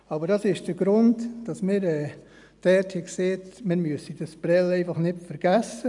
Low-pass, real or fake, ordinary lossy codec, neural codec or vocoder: 10.8 kHz; real; none; none